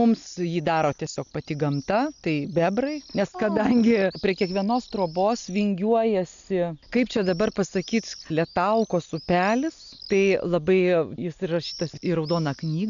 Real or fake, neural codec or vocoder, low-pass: real; none; 7.2 kHz